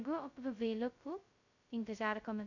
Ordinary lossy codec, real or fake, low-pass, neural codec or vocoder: none; fake; 7.2 kHz; codec, 16 kHz, 0.2 kbps, FocalCodec